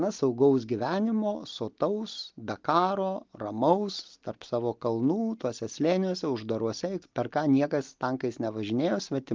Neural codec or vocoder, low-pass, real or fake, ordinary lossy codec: none; 7.2 kHz; real; Opus, 24 kbps